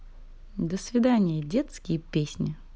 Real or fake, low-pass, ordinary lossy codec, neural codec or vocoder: real; none; none; none